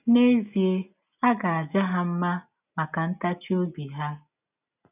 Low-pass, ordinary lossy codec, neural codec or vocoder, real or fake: 3.6 kHz; none; none; real